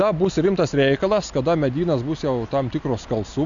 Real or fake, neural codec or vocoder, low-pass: real; none; 7.2 kHz